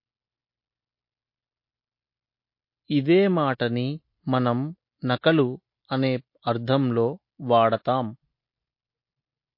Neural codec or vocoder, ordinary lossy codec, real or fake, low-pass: none; MP3, 32 kbps; real; 5.4 kHz